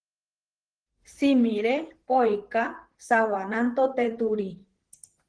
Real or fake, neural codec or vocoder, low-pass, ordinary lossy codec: fake; vocoder, 44.1 kHz, 128 mel bands, Pupu-Vocoder; 9.9 kHz; Opus, 16 kbps